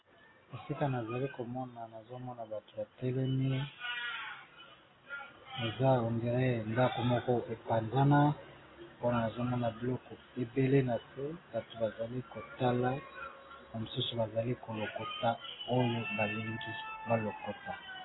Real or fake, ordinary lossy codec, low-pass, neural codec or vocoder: real; AAC, 16 kbps; 7.2 kHz; none